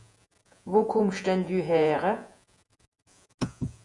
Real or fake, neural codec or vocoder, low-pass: fake; vocoder, 48 kHz, 128 mel bands, Vocos; 10.8 kHz